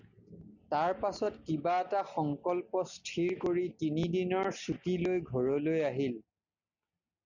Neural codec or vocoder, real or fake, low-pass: none; real; 7.2 kHz